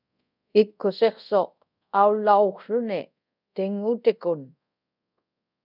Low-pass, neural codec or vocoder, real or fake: 5.4 kHz; codec, 24 kHz, 0.5 kbps, DualCodec; fake